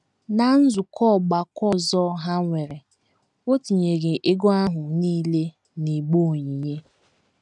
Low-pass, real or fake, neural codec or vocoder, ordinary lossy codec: 10.8 kHz; real; none; none